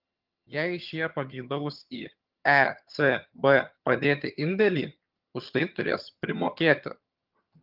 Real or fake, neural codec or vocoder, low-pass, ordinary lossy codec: fake; vocoder, 22.05 kHz, 80 mel bands, HiFi-GAN; 5.4 kHz; Opus, 32 kbps